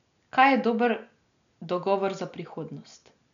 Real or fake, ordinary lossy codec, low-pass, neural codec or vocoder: real; none; 7.2 kHz; none